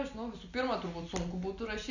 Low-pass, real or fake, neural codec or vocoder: 7.2 kHz; real; none